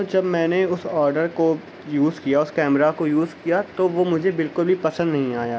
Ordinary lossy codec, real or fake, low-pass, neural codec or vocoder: none; real; none; none